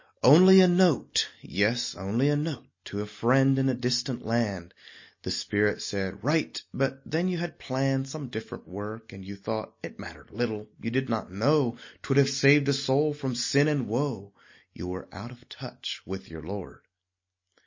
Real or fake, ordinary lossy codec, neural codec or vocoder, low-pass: real; MP3, 32 kbps; none; 7.2 kHz